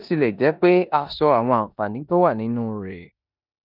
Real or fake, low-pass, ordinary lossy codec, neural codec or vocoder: fake; 5.4 kHz; none; codec, 16 kHz in and 24 kHz out, 0.9 kbps, LongCat-Audio-Codec, four codebook decoder